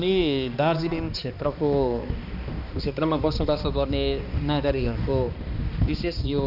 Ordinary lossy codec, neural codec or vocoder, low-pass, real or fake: none; codec, 16 kHz, 2 kbps, X-Codec, HuBERT features, trained on balanced general audio; 5.4 kHz; fake